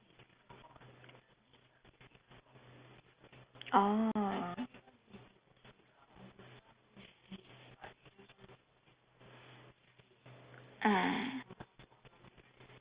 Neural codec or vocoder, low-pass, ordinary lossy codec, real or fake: none; 3.6 kHz; Opus, 24 kbps; real